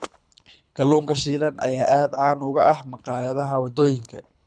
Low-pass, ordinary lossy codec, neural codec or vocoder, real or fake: 9.9 kHz; none; codec, 24 kHz, 3 kbps, HILCodec; fake